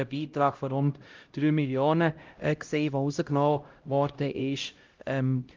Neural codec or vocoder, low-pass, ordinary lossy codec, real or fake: codec, 16 kHz, 0.5 kbps, X-Codec, HuBERT features, trained on LibriSpeech; 7.2 kHz; Opus, 32 kbps; fake